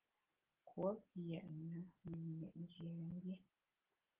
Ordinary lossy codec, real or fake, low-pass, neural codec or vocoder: Opus, 16 kbps; real; 3.6 kHz; none